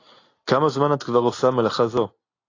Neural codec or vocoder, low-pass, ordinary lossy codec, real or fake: none; 7.2 kHz; AAC, 32 kbps; real